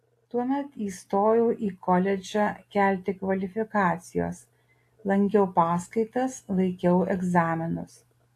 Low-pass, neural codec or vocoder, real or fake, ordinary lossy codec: 14.4 kHz; none; real; AAC, 64 kbps